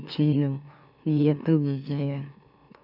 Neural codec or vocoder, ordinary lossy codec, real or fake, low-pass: autoencoder, 44.1 kHz, a latent of 192 numbers a frame, MeloTTS; none; fake; 5.4 kHz